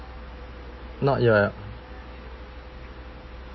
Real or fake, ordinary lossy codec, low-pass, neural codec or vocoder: real; MP3, 24 kbps; 7.2 kHz; none